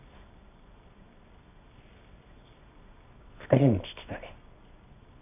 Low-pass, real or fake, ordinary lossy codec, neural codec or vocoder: 3.6 kHz; fake; none; codec, 24 kHz, 0.9 kbps, WavTokenizer, medium music audio release